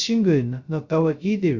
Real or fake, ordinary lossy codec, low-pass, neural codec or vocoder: fake; Opus, 64 kbps; 7.2 kHz; codec, 16 kHz, 0.2 kbps, FocalCodec